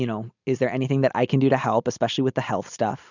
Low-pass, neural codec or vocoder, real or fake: 7.2 kHz; none; real